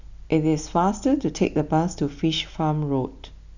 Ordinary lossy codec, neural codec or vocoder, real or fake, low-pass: none; none; real; 7.2 kHz